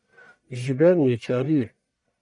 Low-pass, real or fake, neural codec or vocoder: 10.8 kHz; fake; codec, 44.1 kHz, 1.7 kbps, Pupu-Codec